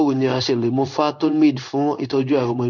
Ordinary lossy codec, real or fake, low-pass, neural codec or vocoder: none; fake; 7.2 kHz; codec, 16 kHz in and 24 kHz out, 1 kbps, XY-Tokenizer